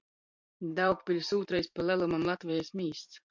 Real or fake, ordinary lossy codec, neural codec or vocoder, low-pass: fake; MP3, 64 kbps; vocoder, 44.1 kHz, 128 mel bands every 512 samples, BigVGAN v2; 7.2 kHz